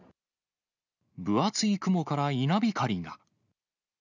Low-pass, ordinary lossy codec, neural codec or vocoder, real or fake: 7.2 kHz; none; none; real